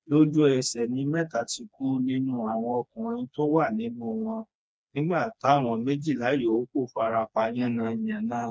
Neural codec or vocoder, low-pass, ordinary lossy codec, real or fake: codec, 16 kHz, 2 kbps, FreqCodec, smaller model; none; none; fake